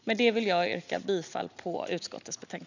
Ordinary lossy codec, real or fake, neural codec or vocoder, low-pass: none; real; none; 7.2 kHz